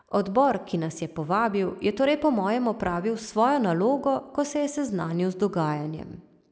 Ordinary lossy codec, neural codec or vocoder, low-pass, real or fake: none; none; none; real